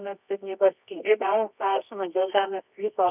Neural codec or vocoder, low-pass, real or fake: codec, 24 kHz, 0.9 kbps, WavTokenizer, medium music audio release; 3.6 kHz; fake